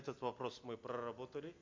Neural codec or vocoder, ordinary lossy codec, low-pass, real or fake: none; MP3, 48 kbps; 7.2 kHz; real